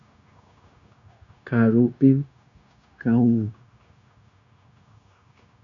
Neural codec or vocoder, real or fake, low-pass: codec, 16 kHz, 0.9 kbps, LongCat-Audio-Codec; fake; 7.2 kHz